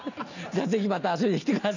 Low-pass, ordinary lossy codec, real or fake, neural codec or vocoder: 7.2 kHz; none; real; none